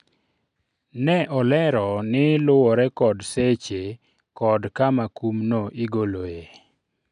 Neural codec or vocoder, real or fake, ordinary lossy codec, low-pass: none; real; none; 9.9 kHz